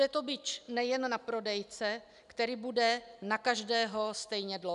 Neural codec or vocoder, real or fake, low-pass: none; real; 10.8 kHz